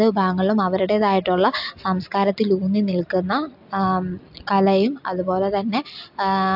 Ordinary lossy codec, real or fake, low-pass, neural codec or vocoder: AAC, 48 kbps; real; 5.4 kHz; none